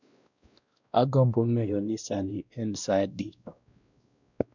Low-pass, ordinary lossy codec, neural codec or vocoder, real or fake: 7.2 kHz; none; codec, 16 kHz, 1 kbps, X-Codec, WavLM features, trained on Multilingual LibriSpeech; fake